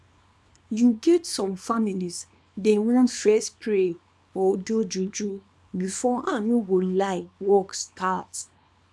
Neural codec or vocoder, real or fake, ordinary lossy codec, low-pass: codec, 24 kHz, 0.9 kbps, WavTokenizer, small release; fake; none; none